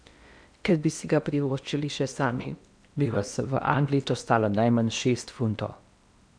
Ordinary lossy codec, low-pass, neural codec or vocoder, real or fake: none; 9.9 kHz; codec, 16 kHz in and 24 kHz out, 0.8 kbps, FocalCodec, streaming, 65536 codes; fake